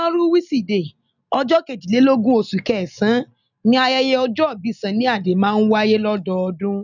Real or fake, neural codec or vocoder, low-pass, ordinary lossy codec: real; none; 7.2 kHz; none